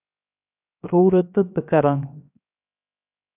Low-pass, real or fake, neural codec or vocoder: 3.6 kHz; fake; codec, 16 kHz, 0.7 kbps, FocalCodec